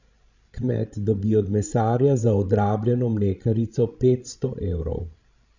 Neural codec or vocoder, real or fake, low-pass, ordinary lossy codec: codec, 16 kHz, 16 kbps, FreqCodec, larger model; fake; 7.2 kHz; none